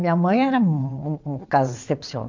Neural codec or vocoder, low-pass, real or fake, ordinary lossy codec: vocoder, 22.05 kHz, 80 mel bands, WaveNeXt; 7.2 kHz; fake; MP3, 64 kbps